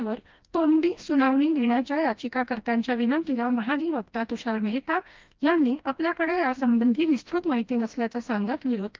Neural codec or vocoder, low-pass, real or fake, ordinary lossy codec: codec, 16 kHz, 1 kbps, FreqCodec, smaller model; 7.2 kHz; fake; Opus, 24 kbps